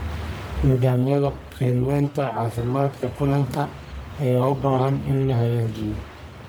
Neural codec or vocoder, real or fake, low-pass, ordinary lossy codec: codec, 44.1 kHz, 1.7 kbps, Pupu-Codec; fake; none; none